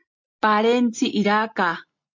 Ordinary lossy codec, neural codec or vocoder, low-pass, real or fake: MP3, 48 kbps; none; 7.2 kHz; real